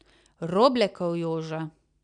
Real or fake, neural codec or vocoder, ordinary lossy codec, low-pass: real; none; none; 9.9 kHz